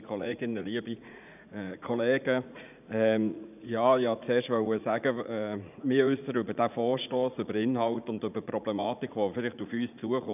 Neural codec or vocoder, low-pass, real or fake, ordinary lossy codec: vocoder, 44.1 kHz, 80 mel bands, Vocos; 3.6 kHz; fake; none